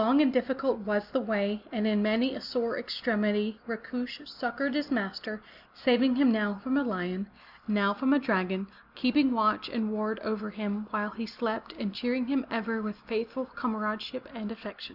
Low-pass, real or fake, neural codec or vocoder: 5.4 kHz; real; none